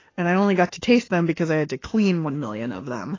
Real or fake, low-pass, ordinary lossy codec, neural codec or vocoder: fake; 7.2 kHz; AAC, 32 kbps; autoencoder, 48 kHz, 32 numbers a frame, DAC-VAE, trained on Japanese speech